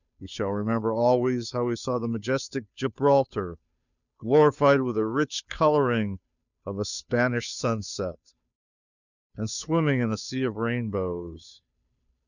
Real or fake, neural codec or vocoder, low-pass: fake; codec, 16 kHz, 2 kbps, FunCodec, trained on Chinese and English, 25 frames a second; 7.2 kHz